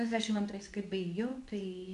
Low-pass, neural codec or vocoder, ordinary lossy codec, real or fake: 10.8 kHz; codec, 24 kHz, 0.9 kbps, WavTokenizer, medium speech release version 2; AAC, 64 kbps; fake